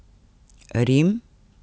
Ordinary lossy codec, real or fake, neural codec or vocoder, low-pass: none; real; none; none